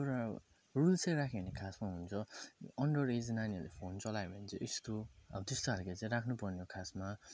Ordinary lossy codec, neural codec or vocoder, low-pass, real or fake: none; none; none; real